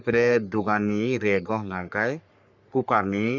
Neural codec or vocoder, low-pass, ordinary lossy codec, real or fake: codec, 44.1 kHz, 3.4 kbps, Pupu-Codec; 7.2 kHz; none; fake